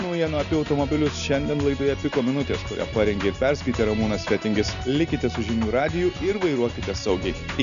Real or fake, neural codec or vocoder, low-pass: real; none; 7.2 kHz